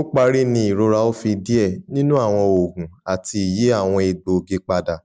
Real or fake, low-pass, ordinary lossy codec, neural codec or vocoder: real; none; none; none